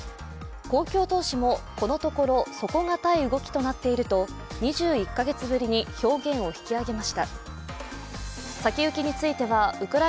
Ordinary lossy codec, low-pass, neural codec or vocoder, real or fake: none; none; none; real